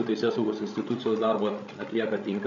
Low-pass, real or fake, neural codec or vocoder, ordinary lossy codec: 7.2 kHz; fake; codec, 16 kHz, 16 kbps, FreqCodec, larger model; MP3, 96 kbps